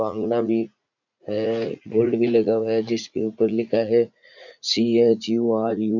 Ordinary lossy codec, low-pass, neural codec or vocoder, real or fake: none; 7.2 kHz; vocoder, 44.1 kHz, 80 mel bands, Vocos; fake